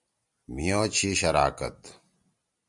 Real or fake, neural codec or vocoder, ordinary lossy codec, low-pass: real; none; MP3, 96 kbps; 10.8 kHz